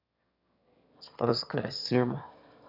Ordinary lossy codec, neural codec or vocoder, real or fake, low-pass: none; autoencoder, 22.05 kHz, a latent of 192 numbers a frame, VITS, trained on one speaker; fake; 5.4 kHz